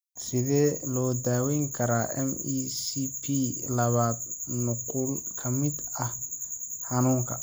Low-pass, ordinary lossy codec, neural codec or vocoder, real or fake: none; none; none; real